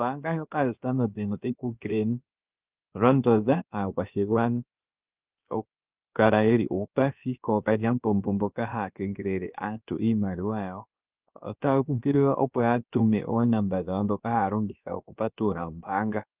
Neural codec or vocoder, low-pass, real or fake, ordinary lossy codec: codec, 16 kHz, about 1 kbps, DyCAST, with the encoder's durations; 3.6 kHz; fake; Opus, 24 kbps